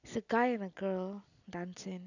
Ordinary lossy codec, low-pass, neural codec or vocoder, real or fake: none; 7.2 kHz; none; real